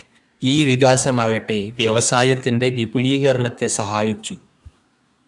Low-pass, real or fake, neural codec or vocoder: 10.8 kHz; fake; codec, 24 kHz, 1 kbps, SNAC